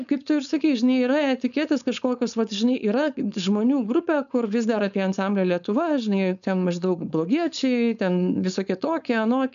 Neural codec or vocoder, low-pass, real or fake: codec, 16 kHz, 4.8 kbps, FACodec; 7.2 kHz; fake